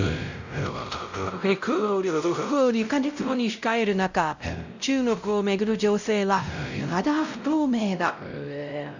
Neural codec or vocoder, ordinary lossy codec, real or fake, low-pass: codec, 16 kHz, 0.5 kbps, X-Codec, WavLM features, trained on Multilingual LibriSpeech; none; fake; 7.2 kHz